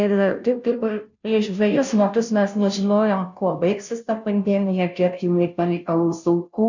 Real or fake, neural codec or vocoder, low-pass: fake; codec, 16 kHz, 0.5 kbps, FunCodec, trained on Chinese and English, 25 frames a second; 7.2 kHz